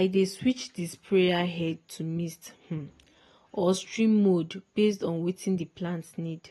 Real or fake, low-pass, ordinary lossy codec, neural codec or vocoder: real; 19.8 kHz; AAC, 32 kbps; none